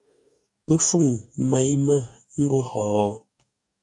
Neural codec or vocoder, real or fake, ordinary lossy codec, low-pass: codec, 44.1 kHz, 2.6 kbps, DAC; fake; AAC, 64 kbps; 10.8 kHz